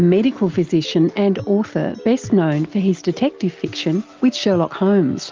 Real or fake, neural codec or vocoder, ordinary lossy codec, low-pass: real; none; Opus, 32 kbps; 7.2 kHz